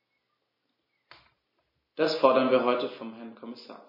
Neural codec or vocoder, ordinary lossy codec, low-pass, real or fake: none; MP3, 24 kbps; 5.4 kHz; real